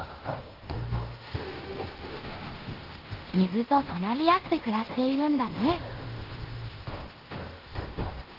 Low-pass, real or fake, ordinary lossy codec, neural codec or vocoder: 5.4 kHz; fake; Opus, 16 kbps; codec, 16 kHz in and 24 kHz out, 0.9 kbps, LongCat-Audio-Codec, fine tuned four codebook decoder